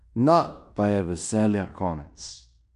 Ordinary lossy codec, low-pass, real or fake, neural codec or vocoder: none; 10.8 kHz; fake; codec, 16 kHz in and 24 kHz out, 0.9 kbps, LongCat-Audio-Codec, fine tuned four codebook decoder